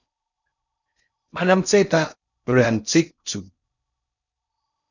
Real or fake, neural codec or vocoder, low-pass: fake; codec, 16 kHz in and 24 kHz out, 0.6 kbps, FocalCodec, streaming, 4096 codes; 7.2 kHz